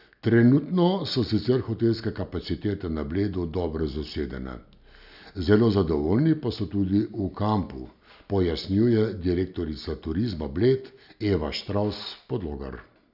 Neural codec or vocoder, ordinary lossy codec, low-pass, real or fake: none; none; 5.4 kHz; real